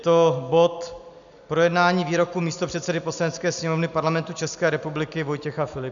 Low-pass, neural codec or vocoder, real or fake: 7.2 kHz; none; real